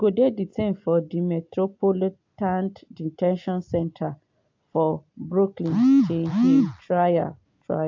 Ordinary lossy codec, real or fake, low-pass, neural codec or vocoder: AAC, 48 kbps; real; 7.2 kHz; none